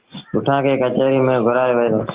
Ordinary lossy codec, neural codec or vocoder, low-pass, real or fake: Opus, 16 kbps; none; 3.6 kHz; real